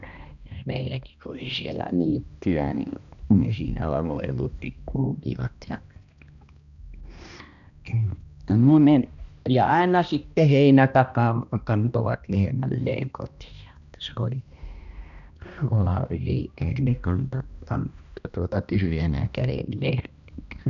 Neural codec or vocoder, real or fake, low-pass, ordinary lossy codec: codec, 16 kHz, 1 kbps, X-Codec, HuBERT features, trained on balanced general audio; fake; 7.2 kHz; none